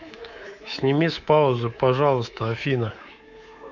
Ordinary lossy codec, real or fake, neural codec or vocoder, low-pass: none; fake; codec, 24 kHz, 3.1 kbps, DualCodec; 7.2 kHz